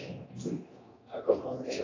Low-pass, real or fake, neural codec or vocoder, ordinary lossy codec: 7.2 kHz; fake; codec, 24 kHz, 0.9 kbps, DualCodec; AAC, 32 kbps